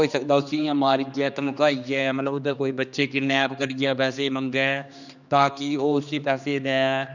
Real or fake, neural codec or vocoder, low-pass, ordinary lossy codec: fake; codec, 16 kHz, 2 kbps, X-Codec, HuBERT features, trained on general audio; 7.2 kHz; none